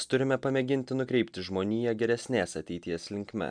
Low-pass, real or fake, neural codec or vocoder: 9.9 kHz; real; none